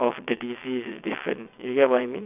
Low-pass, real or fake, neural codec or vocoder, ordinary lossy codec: 3.6 kHz; fake; vocoder, 22.05 kHz, 80 mel bands, WaveNeXt; none